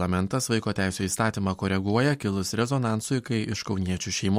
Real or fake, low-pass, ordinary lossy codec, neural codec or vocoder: real; 19.8 kHz; MP3, 64 kbps; none